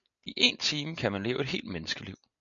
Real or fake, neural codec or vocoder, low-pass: real; none; 7.2 kHz